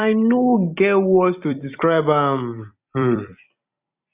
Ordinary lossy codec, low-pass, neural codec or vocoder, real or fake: Opus, 64 kbps; 3.6 kHz; vocoder, 44.1 kHz, 128 mel bands every 256 samples, BigVGAN v2; fake